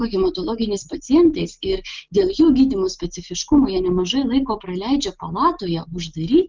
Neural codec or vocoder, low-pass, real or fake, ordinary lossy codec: none; 7.2 kHz; real; Opus, 32 kbps